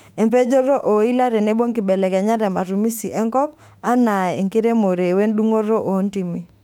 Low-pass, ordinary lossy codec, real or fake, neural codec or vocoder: 19.8 kHz; none; fake; autoencoder, 48 kHz, 32 numbers a frame, DAC-VAE, trained on Japanese speech